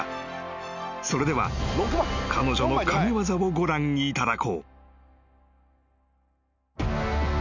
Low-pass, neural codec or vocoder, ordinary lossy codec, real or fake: 7.2 kHz; none; none; real